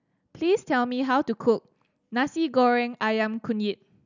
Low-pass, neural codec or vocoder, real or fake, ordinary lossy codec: 7.2 kHz; none; real; none